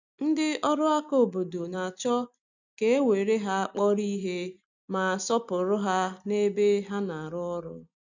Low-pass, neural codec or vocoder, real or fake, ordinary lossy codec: 7.2 kHz; none; real; none